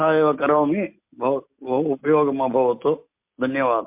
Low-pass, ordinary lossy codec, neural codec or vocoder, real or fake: 3.6 kHz; MP3, 32 kbps; none; real